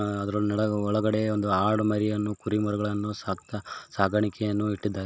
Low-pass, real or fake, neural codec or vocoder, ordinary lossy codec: none; real; none; none